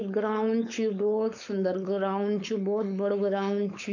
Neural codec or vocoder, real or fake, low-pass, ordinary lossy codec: codec, 16 kHz, 4.8 kbps, FACodec; fake; 7.2 kHz; none